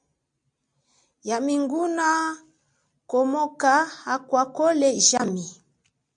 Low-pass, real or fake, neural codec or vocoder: 9.9 kHz; real; none